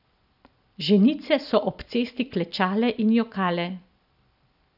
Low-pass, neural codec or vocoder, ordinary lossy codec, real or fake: 5.4 kHz; none; none; real